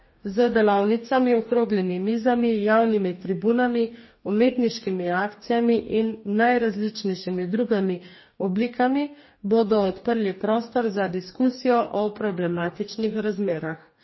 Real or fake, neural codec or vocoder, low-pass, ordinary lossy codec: fake; codec, 44.1 kHz, 2.6 kbps, DAC; 7.2 kHz; MP3, 24 kbps